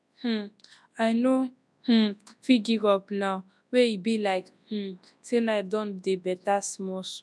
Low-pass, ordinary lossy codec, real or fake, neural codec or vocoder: none; none; fake; codec, 24 kHz, 0.9 kbps, WavTokenizer, large speech release